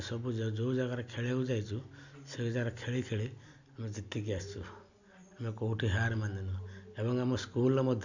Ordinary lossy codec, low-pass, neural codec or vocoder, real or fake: none; 7.2 kHz; none; real